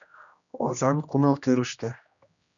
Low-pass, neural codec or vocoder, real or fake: 7.2 kHz; codec, 16 kHz, 1 kbps, X-Codec, HuBERT features, trained on general audio; fake